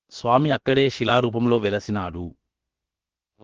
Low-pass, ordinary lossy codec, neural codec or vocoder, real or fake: 7.2 kHz; Opus, 16 kbps; codec, 16 kHz, about 1 kbps, DyCAST, with the encoder's durations; fake